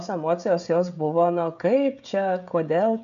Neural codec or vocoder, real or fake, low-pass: codec, 16 kHz, 16 kbps, FreqCodec, smaller model; fake; 7.2 kHz